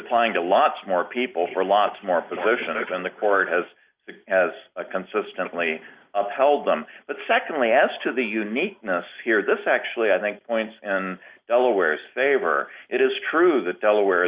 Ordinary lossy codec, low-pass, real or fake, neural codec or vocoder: Opus, 64 kbps; 3.6 kHz; real; none